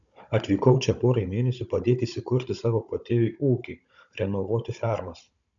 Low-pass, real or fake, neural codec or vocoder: 7.2 kHz; fake; codec, 16 kHz, 16 kbps, FunCodec, trained on Chinese and English, 50 frames a second